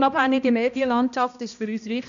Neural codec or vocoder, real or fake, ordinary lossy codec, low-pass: codec, 16 kHz, 1 kbps, X-Codec, HuBERT features, trained on balanced general audio; fake; none; 7.2 kHz